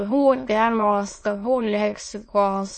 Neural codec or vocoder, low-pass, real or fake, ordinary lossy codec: autoencoder, 22.05 kHz, a latent of 192 numbers a frame, VITS, trained on many speakers; 9.9 kHz; fake; MP3, 32 kbps